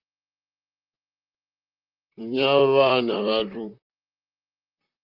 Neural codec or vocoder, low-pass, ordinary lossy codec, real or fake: vocoder, 44.1 kHz, 128 mel bands, Pupu-Vocoder; 5.4 kHz; Opus, 24 kbps; fake